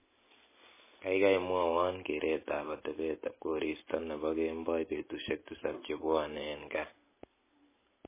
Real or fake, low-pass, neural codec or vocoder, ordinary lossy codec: real; 3.6 kHz; none; MP3, 16 kbps